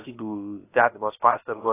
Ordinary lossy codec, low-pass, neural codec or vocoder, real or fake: AAC, 16 kbps; 3.6 kHz; codec, 16 kHz, about 1 kbps, DyCAST, with the encoder's durations; fake